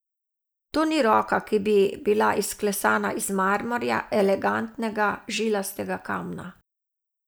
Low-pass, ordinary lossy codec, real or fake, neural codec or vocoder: none; none; real; none